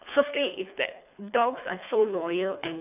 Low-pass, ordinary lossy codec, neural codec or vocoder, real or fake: 3.6 kHz; none; codec, 24 kHz, 3 kbps, HILCodec; fake